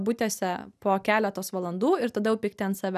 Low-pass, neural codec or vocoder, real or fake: 14.4 kHz; none; real